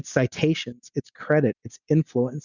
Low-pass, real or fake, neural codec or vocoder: 7.2 kHz; real; none